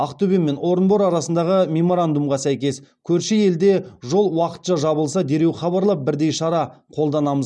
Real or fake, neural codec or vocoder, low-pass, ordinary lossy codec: real; none; 9.9 kHz; none